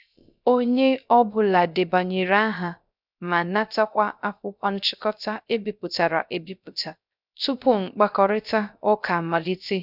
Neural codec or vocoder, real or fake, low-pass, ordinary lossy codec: codec, 16 kHz, 0.3 kbps, FocalCodec; fake; 5.4 kHz; none